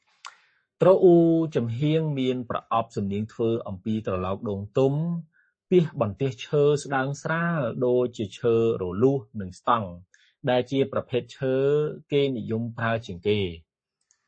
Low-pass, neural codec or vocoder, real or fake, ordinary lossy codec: 9.9 kHz; codec, 44.1 kHz, 7.8 kbps, Pupu-Codec; fake; MP3, 32 kbps